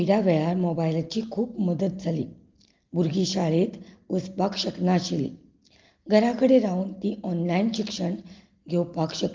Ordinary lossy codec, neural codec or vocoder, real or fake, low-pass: Opus, 32 kbps; none; real; 7.2 kHz